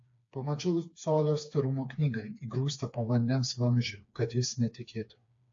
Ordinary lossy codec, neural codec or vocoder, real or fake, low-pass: AAC, 48 kbps; codec, 16 kHz, 4 kbps, FreqCodec, smaller model; fake; 7.2 kHz